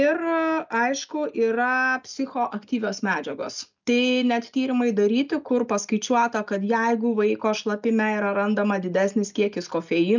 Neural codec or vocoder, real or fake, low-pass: none; real; 7.2 kHz